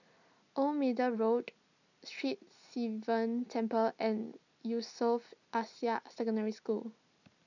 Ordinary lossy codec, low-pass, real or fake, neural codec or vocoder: none; 7.2 kHz; real; none